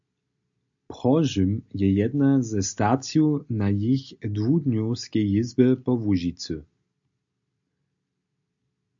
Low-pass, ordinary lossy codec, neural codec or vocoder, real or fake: 7.2 kHz; AAC, 64 kbps; none; real